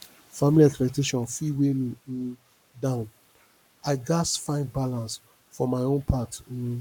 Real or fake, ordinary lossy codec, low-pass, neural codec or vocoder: fake; none; 19.8 kHz; codec, 44.1 kHz, 7.8 kbps, Pupu-Codec